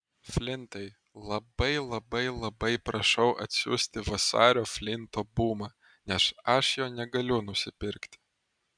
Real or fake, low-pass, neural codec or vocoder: real; 9.9 kHz; none